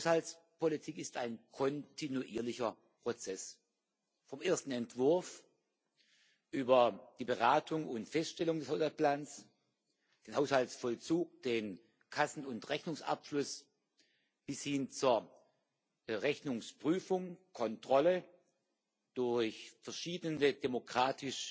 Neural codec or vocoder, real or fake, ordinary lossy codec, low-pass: none; real; none; none